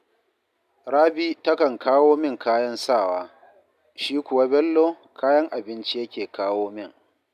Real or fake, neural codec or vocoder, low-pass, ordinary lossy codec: real; none; 14.4 kHz; none